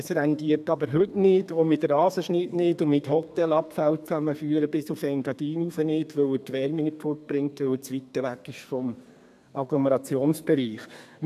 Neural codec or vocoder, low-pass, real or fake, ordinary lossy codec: codec, 32 kHz, 1.9 kbps, SNAC; 14.4 kHz; fake; none